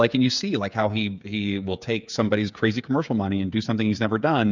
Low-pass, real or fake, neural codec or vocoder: 7.2 kHz; fake; codec, 16 kHz, 8 kbps, FreqCodec, smaller model